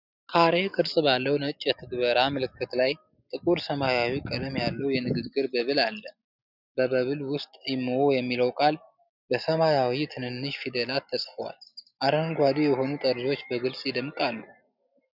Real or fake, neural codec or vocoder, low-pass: real; none; 5.4 kHz